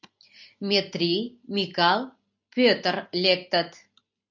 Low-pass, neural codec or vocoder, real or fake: 7.2 kHz; none; real